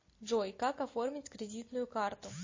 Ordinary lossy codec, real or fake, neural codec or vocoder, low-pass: MP3, 32 kbps; real; none; 7.2 kHz